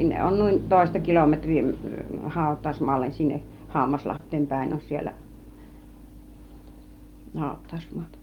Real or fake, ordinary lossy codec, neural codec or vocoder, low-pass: real; Opus, 32 kbps; none; 19.8 kHz